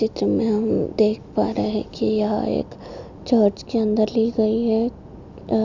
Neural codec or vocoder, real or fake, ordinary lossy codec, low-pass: none; real; none; 7.2 kHz